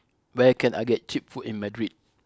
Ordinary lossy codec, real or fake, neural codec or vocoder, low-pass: none; real; none; none